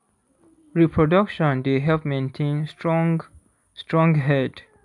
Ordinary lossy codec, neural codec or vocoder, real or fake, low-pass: none; none; real; 10.8 kHz